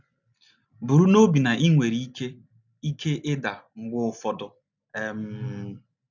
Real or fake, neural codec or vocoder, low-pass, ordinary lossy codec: real; none; 7.2 kHz; none